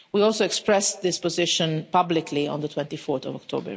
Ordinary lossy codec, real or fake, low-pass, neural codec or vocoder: none; real; none; none